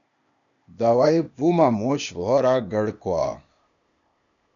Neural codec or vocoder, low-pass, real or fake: codec, 16 kHz, 0.8 kbps, ZipCodec; 7.2 kHz; fake